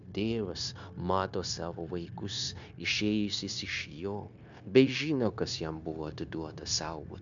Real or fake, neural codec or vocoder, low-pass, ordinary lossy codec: fake; codec, 16 kHz, 0.9 kbps, LongCat-Audio-Codec; 7.2 kHz; MP3, 64 kbps